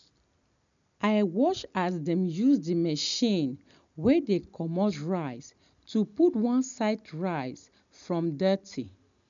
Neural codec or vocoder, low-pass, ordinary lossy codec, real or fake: none; 7.2 kHz; none; real